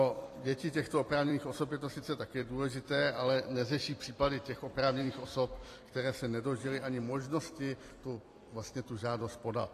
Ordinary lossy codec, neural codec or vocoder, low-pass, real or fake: AAC, 48 kbps; vocoder, 44.1 kHz, 128 mel bands every 256 samples, BigVGAN v2; 14.4 kHz; fake